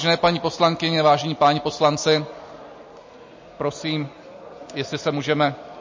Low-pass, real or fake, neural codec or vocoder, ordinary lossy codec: 7.2 kHz; real; none; MP3, 32 kbps